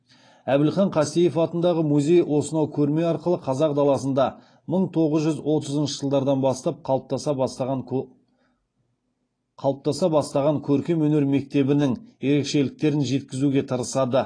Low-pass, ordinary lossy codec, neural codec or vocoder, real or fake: 9.9 kHz; AAC, 32 kbps; none; real